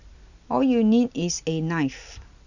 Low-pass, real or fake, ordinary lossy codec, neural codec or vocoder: 7.2 kHz; real; none; none